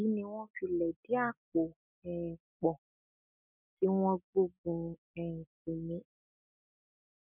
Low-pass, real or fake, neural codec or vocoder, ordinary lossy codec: 3.6 kHz; real; none; none